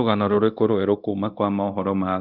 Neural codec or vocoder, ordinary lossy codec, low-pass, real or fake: codec, 24 kHz, 0.9 kbps, DualCodec; Opus, 24 kbps; 5.4 kHz; fake